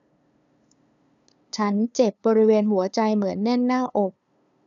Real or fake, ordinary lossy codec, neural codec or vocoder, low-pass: fake; none; codec, 16 kHz, 2 kbps, FunCodec, trained on LibriTTS, 25 frames a second; 7.2 kHz